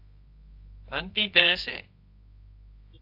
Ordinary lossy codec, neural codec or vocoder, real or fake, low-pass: AAC, 48 kbps; codec, 24 kHz, 0.9 kbps, WavTokenizer, medium music audio release; fake; 5.4 kHz